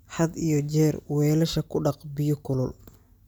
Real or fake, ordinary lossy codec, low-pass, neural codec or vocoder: real; none; none; none